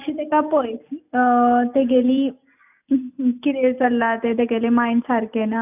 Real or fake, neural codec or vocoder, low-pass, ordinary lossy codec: real; none; 3.6 kHz; none